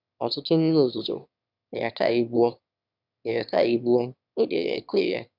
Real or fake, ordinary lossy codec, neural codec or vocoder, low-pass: fake; none; autoencoder, 22.05 kHz, a latent of 192 numbers a frame, VITS, trained on one speaker; 5.4 kHz